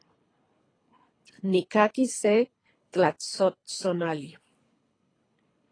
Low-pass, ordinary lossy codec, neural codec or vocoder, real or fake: 9.9 kHz; AAC, 32 kbps; codec, 24 kHz, 3 kbps, HILCodec; fake